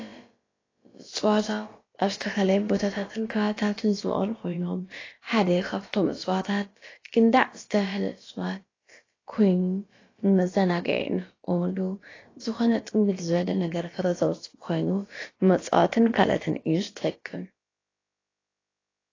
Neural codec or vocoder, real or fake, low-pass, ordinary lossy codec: codec, 16 kHz, about 1 kbps, DyCAST, with the encoder's durations; fake; 7.2 kHz; AAC, 32 kbps